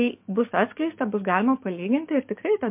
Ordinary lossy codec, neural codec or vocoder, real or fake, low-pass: MP3, 32 kbps; codec, 44.1 kHz, 7.8 kbps, DAC; fake; 3.6 kHz